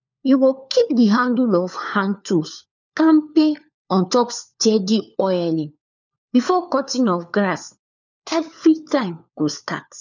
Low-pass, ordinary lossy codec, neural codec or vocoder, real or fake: 7.2 kHz; none; codec, 16 kHz, 4 kbps, FunCodec, trained on LibriTTS, 50 frames a second; fake